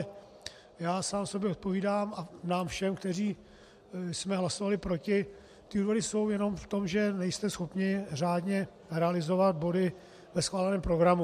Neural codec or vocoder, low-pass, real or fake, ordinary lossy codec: none; 14.4 kHz; real; MP3, 64 kbps